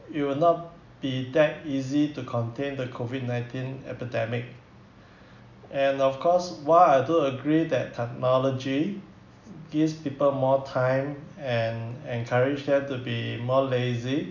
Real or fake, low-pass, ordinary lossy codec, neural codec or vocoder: real; 7.2 kHz; none; none